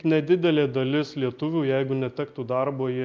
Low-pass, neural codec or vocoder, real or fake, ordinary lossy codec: 7.2 kHz; none; real; Opus, 24 kbps